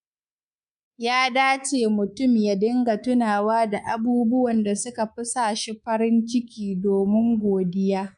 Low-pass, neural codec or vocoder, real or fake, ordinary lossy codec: 10.8 kHz; codec, 24 kHz, 3.1 kbps, DualCodec; fake; none